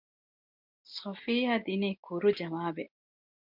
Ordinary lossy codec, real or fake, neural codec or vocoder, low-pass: MP3, 48 kbps; real; none; 5.4 kHz